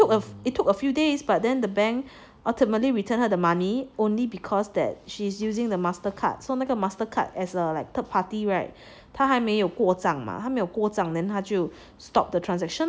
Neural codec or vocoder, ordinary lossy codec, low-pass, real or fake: none; none; none; real